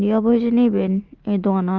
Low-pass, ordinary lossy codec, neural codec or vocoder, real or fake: 7.2 kHz; Opus, 32 kbps; none; real